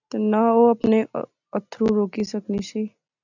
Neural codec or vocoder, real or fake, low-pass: none; real; 7.2 kHz